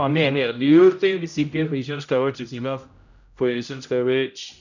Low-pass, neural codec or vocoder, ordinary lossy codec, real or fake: 7.2 kHz; codec, 16 kHz, 0.5 kbps, X-Codec, HuBERT features, trained on general audio; none; fake